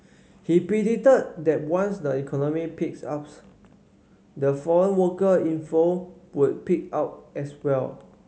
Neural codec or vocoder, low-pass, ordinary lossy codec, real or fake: none; none; none; real